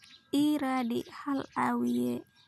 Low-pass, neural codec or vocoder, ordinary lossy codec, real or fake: 14.4 kHz; none; MP3, 96 kbps; real